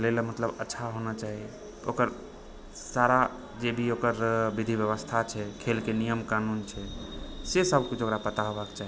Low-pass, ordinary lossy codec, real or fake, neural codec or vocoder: none; none; real; none